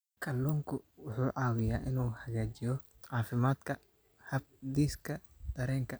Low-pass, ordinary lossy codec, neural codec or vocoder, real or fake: none; none; none; real